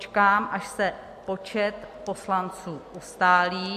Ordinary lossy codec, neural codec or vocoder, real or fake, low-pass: MP3, 64 kbps; none; real; 14.4 kHz